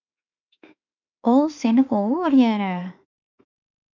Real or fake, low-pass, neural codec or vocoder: fake; 7.2 kHz; autoencoder, 48 kHz, 32 numbers a frame, DAC-VAE, trained on Japanese speech